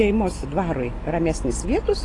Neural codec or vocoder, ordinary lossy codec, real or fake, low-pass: none; AAC, 32 kbps; real; 10.8 kHz